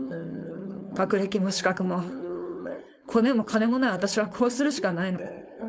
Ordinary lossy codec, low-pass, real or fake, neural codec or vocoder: none; none; fake; codec, 16 kHz, 4.8 kbps, FACodec